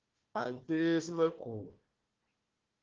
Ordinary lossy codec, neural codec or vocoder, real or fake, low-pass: Opus, 16 kbps; codec, 16 kHz, 1 kbps, FunCodec, trained on Chinese and English, 50 frames a second; fake; 7.2 kHz